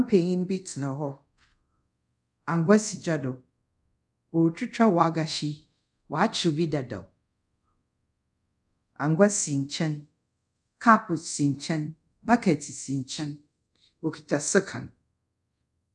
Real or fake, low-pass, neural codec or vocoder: fake; 10.8 kHz; codec, 24 kHz, 0.5 kbps, DualCodec